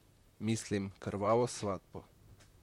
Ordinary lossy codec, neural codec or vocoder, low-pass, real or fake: MP3, 64 kbps; vocoder, 44.1 kHz, 128 mel bands, Pupu-Vocoder; 19.8 kHz; fake